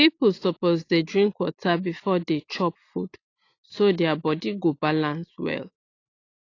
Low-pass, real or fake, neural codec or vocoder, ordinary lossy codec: 7.2 kHz; real; none; AAC, 32 kbps